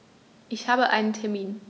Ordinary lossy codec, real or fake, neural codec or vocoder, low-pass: none; real; none; none